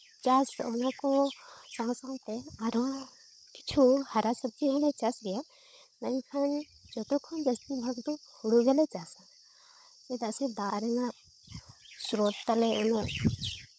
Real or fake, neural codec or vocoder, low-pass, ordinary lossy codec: fake; codec, 16 kHz, 16 kbps, FunCodec, trained on LibriTTS, 50 frames a second; none; none